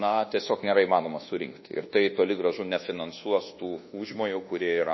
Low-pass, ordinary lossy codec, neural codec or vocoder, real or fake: 7.2 kHz; MP3, 24 kbps; codec, 24 kHz, 1.2 kbps, DualCodec; fake